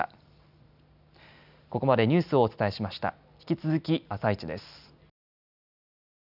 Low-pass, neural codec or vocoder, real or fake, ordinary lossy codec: 5.4 kHz; none; real; none